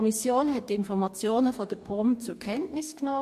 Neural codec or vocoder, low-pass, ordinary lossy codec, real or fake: codec, 44.1 kHz, 2.6 kbps, DAC; 14.4 kHz; MP3, 64 kbps; fake